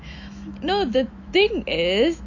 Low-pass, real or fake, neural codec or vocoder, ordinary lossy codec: 7.2 kHz; fake; autoencoder, 48 kHz, 128 numbers a frame, DAC-VAE, trained on Japanese speech; AAC, 48 kbps